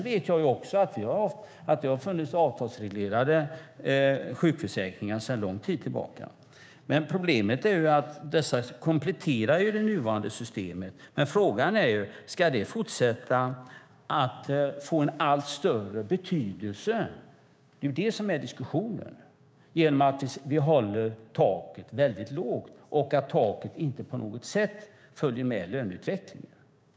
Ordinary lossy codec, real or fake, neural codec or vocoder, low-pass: none; fake; codec, 16 kHz, 6 kbps, DAC; none